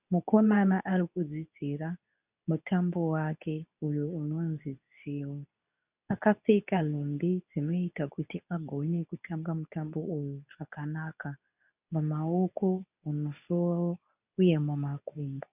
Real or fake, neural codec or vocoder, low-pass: fake; codec, 24 kHz, 0.9 kbps, WavTokenizer, medium speech release version 2; 3.6 kHz